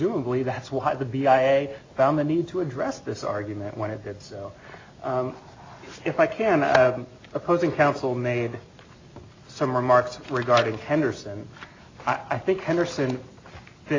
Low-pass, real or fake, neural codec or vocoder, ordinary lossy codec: 7.2 kHz; real; none; AAC, 32 kbps